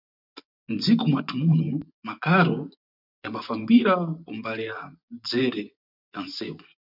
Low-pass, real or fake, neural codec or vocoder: 5.4 kHz; fake; vocoder, 24 kHz, 100 mel bands, Vocos